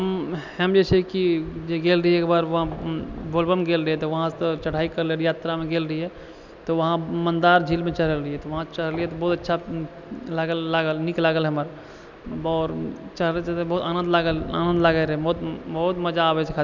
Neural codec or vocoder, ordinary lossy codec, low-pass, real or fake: none; none; 7.2 kHz; real